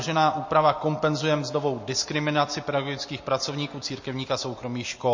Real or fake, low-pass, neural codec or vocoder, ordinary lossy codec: real; 7.2 kHz; none; MP3, 32 kbps